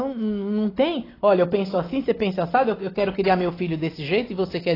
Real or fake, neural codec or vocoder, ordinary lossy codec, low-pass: real; none; AAC, 24 kbps; 5.4 kHz